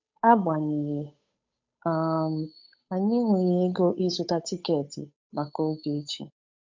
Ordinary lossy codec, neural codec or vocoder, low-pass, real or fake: MP3, 48 kbps; codec, 16 kHz, 8 kbps, FunCodec, trained on Chinese and English, 25 frames a second; 7.2 kHz; fake